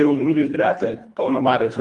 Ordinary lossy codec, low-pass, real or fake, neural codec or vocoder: Opus, 32 kbps; 10.8 kHz; fake; codec, 24 kHz, 1.5 kbps, HILCodec